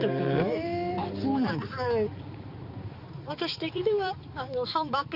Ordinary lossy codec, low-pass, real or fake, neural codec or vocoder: Opus, 64 kbps; 5.4 kHz; fake; codec, 16 kHz, 4 kbps, X-Codec, HuBERT features, trained on balanced general audio